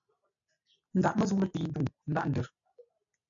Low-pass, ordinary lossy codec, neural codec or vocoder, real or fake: 7.2 kHz; AAC, 64 kbps; none; real